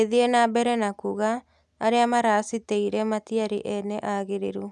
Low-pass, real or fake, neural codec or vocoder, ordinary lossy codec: none; real; none; none